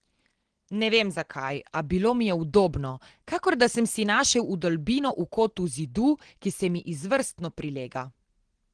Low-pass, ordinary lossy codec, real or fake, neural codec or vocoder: 9.9 kHz; Opus, 16 kbps; real; none